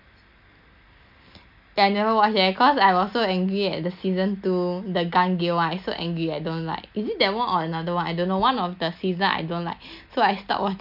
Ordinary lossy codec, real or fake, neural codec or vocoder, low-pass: none; real; none; 5.4 kHz